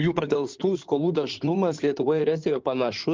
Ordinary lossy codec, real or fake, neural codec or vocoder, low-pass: Opus, 32 kbps; fake; codec, 16 kHz in and 24 kHz out, 2.2 kbps, FireRedTTS-2 codec; 7.2 kHz